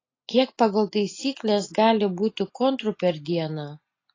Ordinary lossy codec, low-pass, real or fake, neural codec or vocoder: AAC, 32 kbps; 7.2 kHz; real; none